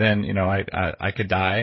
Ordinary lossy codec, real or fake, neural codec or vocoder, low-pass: MP3, 24 kbps; fake; codec, 16 kHz, 16 kbps, FreqCodec, smaller model; 7.2 kHz